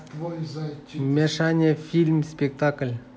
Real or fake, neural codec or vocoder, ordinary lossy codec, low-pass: real; none; none; none